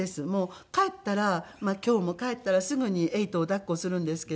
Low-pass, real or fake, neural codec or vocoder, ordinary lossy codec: none; real; none; none